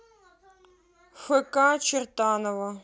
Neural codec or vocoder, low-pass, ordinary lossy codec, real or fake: none; none; none; real